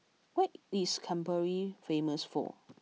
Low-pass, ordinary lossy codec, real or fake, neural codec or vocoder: none; none; real; none